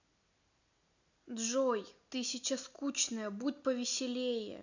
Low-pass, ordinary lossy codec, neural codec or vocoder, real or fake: 7.2 kHz; none; none; real